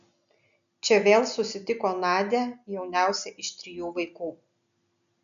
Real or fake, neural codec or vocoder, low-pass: real; none; 7.2 kHz